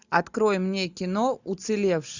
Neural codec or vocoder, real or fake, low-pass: none; real; 7.2 kHz